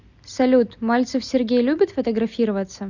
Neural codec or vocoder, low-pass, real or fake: none; 7.2 kHz; real